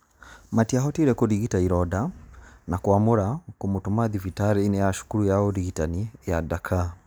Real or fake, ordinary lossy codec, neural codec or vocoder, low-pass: real; none; none; none